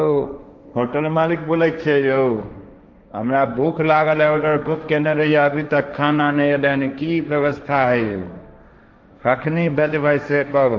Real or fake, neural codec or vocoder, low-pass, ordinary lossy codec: fake; codec, 16 kHz, 1.1 kbps, Voila-Tokenizer; none; none